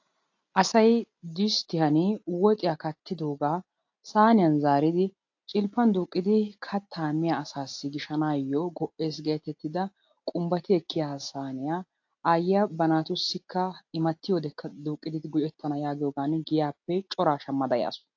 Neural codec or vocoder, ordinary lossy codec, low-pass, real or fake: none; AAC, 48 kbps; 7.2 kHz; real